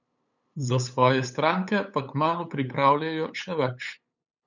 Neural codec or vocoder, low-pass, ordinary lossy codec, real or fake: codec, 16 kHz, 8 kbps, FunCodec, trained on LibriTTS, 25 frames a second; 7.2 kHz; none; fake